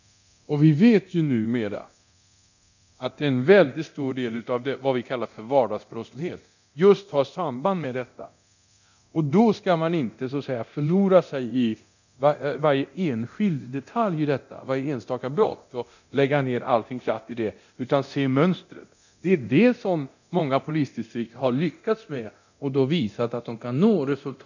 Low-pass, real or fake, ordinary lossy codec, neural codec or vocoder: 7.2 kHz; fake; none; codec, 24 kHz, 0.9 kbps, DualCodec